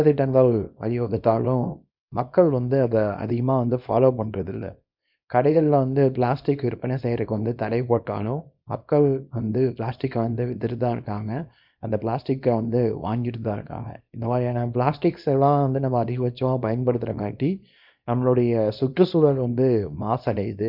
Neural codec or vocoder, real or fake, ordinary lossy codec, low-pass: codec, 24 kHz, 0.9 kbps, WavTokenizer, small release; fake; none; 5.4 kHz